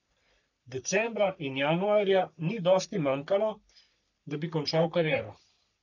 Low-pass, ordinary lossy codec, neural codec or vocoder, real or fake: 7.2 kHz; none; codec, 44.1 kHz, 3.4 kbps, Pupu-Codec; fake